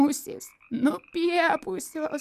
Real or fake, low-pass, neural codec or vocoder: real; 14.4 kHz; none